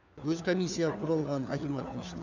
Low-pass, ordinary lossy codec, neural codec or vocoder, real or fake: 7.2 kHz; none; codec, 16 kHz, 4 kbps, FunCodec, trained on LibriTTS, 50 frames a second; fake